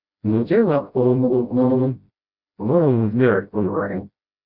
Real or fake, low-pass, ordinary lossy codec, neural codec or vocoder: fake; 5.4 kHz; Opus, 64 kbps; codec, 16 kHz, 0.5 kbps, FreqCodec, smaller model